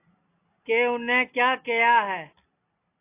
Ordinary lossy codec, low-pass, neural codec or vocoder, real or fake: AAC, 24 kbps; 3.6 kHz; none; real